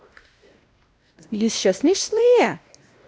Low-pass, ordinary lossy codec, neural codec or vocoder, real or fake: none; none; codec, 16 kHz, 1 kbps, X-Codec, WavLM features, trained on Multilingual LibriSpeech; fake